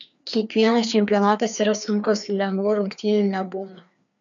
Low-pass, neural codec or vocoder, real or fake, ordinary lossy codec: 7.2 kHz; codec, 16 kHz, 2 kbps, FreqCodec, larger model; fake; MP3, 96 kbps